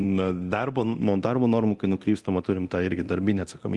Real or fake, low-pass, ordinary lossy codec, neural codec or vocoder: fake; 10.8 kHz; Opus, 24 kbps; codec, 24 kHz, 0.9 kbps, DualCodec